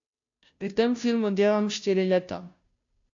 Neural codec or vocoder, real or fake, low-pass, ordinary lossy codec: codec, 16 kHz, 0.5 kbps, FunCodec, trained on Chinese and English, 25 frames a second; fake; 7.2 kHz; AAC, 64 kbps